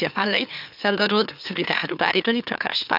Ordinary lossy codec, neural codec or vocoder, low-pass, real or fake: none; autoencoder, 44.1 kHz, a latent of 192 numbers a frame, MeloTTS; 5.4 kHz; fake